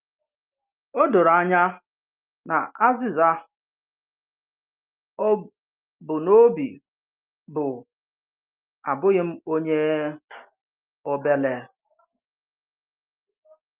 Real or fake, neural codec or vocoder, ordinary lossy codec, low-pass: real; none; Opus, 24 kbps; 3.6 kHz